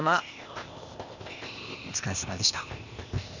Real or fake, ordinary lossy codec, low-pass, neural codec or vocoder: fake; none; 7.2 kHz; codec, 16 kHz, 0.8 kbps, ZipCodec